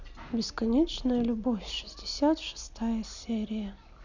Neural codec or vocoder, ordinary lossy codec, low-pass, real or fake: none; none; 7.2 kHz; real